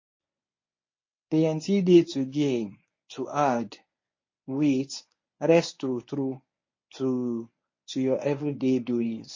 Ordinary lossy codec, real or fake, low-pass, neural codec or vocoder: MP3, 32 kbps; fake; 7.2 kHz; codec, 24 kHz, 0.9 kbps, WavTokenizer, medium speech release version 1